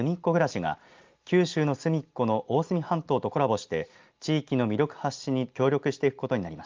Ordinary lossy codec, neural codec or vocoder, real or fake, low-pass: Opus, 32 kbps; none; real; 7.2 kHz